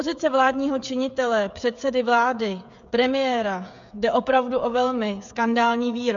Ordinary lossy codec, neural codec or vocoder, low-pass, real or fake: MP3, 64 kbps; codec, 16 kHz, 16 kbps, FreqCodec, smaller model; 7.2 kHz; fake